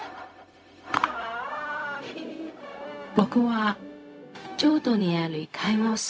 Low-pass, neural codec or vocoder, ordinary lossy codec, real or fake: none; codec, 16 kHz, 0.4 kbps, LongCat-Audio-Codec; none; fake